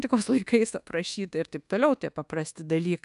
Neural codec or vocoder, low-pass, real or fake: codec, 24 kHz, 1.2 kbps, DualCodec; 10.8 kHz; fake